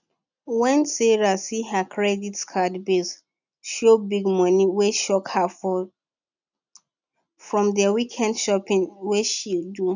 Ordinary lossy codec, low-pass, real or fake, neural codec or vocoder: none; 7.2 kHz; real; none